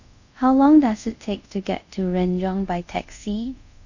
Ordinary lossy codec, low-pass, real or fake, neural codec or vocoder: none; 7.2 kHz; fake; codec, 24 kHz, 0.5 kbps, DualCodec